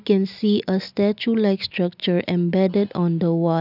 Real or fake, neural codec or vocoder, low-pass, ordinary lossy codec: real; none; 5.4 kHz; none